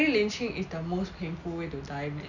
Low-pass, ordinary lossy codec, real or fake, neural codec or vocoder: 7.2 kHz; none; real; none